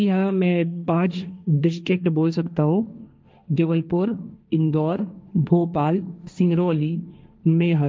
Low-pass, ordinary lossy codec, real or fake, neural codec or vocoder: none; none; fake; codec, 16 kHz, 1.1 kbps, Voila-Tokenizer